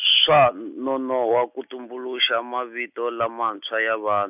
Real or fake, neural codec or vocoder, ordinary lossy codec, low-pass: real; none; none; 3.6 kHz